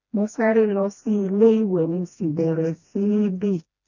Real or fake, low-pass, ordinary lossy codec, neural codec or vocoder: fake; 7.2 kHz; none; codec, 16 kHz, 1 kbps, FreqCodec, smaller model